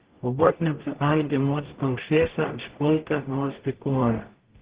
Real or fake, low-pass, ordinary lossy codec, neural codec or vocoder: fake; 3.6 kHz; Opus, 16 kbps; codec, 44.1 kHz, 0.9 kbps, DAC